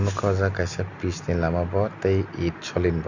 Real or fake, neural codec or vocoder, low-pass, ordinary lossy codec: real; none; 7.2 kHz; none